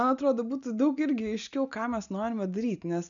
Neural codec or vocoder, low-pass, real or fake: none; 7.2 kHz; real